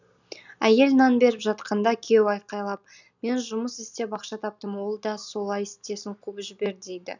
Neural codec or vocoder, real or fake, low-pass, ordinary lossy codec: none; real; 7.2 kHz; none